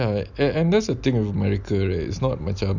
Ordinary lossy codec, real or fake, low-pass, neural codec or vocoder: none; real; 7.2 kHz; none